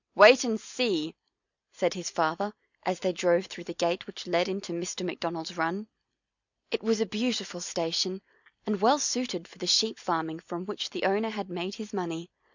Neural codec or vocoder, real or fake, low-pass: none; real; 7.2 kHz